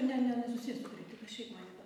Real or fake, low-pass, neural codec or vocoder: real; 19.8 kHz; none